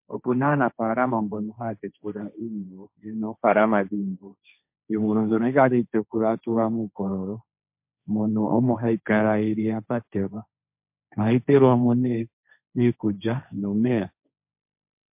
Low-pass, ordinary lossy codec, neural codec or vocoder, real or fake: 3.6 kHz; MP3, 32 kbps; codec, 16 kHz, 1.1 kbps, Voila-Tokenizer; fake